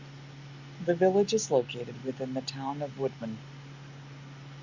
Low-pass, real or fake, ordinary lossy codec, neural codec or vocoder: 7.2 kHz; real; Opus, 64 kbps; none